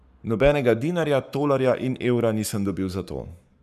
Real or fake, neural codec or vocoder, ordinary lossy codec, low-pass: fake; codec, 44.1 kHz, 7.8 kbps, Pupu-Codec; none; 14.4 kHz